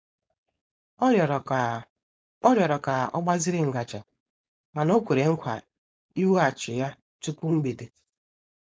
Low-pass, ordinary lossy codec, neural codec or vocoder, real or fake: none; none; codec, 16 kHz, 4.8 kbps, FACodec; fake